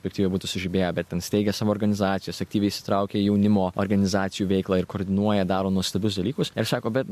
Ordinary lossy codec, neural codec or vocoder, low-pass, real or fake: MP3, 64 kbps; none; 14.4 kHz; real